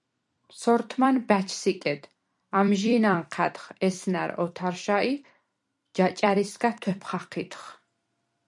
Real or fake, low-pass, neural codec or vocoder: fake; 10.8 kHz; vocoder, 44.1 kHz, 128 mel bands every 256 samples, BigVGAN v2